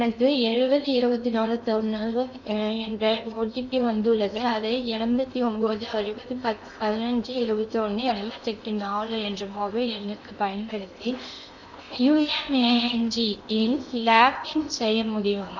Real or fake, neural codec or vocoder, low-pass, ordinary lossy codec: fake; codec, 16 kHz in and 24 kHz out, 0.8 kbps, FocalCodec, streaming, 65536 codes; 7.2 kHz; none